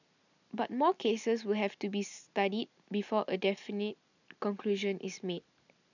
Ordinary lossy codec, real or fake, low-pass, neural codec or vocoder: none; real; 7.2 kHz; none